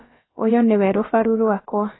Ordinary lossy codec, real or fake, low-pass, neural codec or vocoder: AAC, 16 kbps; fake; 7.2 kHz; codec, 16 kHz, about 1 kbps, DyCAST, with the encoder's durations